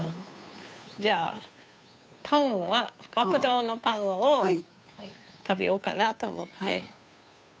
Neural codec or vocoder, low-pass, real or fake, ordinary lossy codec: codec, 16 kHz, 2 kbps, FunCodec, trained on Chinese and English, 25 frames a second; none; fake; none